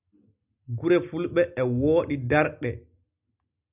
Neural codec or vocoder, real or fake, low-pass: none; real; 3.6 kHz